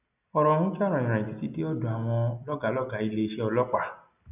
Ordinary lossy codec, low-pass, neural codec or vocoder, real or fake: none; 3.6 kHz; none; real